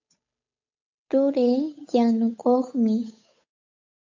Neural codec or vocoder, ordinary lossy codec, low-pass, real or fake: codec, 16 kHz, 8 kbps, FunCodec, trained on Chinese and English, 25 frames a second; AAC, 48 kbps; 7.2 kHz; fake